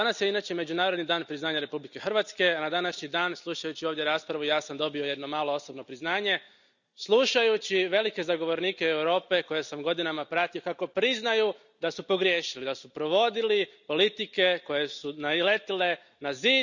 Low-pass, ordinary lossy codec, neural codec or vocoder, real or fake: 7.2 kHz; none; none; real